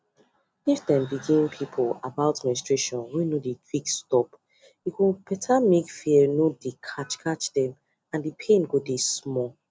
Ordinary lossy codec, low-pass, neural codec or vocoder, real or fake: none; none; none; real